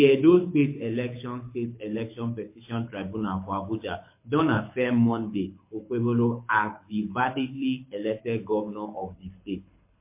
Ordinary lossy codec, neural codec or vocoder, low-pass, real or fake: MP3, 24 kbps; codec, 24 kHz, 6 kbps, HILCodec; 3.6 kHz; fake